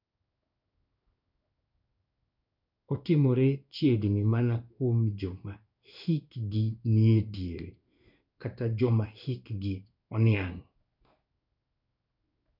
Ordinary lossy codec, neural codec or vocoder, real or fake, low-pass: none; codec, 16 kHz in and 24 kHz out, 1 kbps, XY-Tokenizer; fake; 5.4 kHz